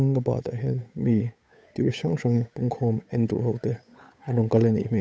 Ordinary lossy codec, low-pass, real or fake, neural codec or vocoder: none; none; fake; codec, 16 kHz, 8 kbps, FunCodec, trained on Chinese and English, 25 frames a second